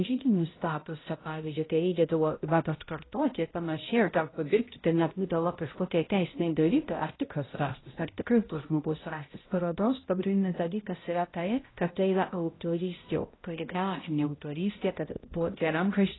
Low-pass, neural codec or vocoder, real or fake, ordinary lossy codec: 7.2 kHz; codec, 16 kHz, 0.5 kbps, X-Codec, HuBERT features, trained on balanced general audio; fake; AAC, 16 kbps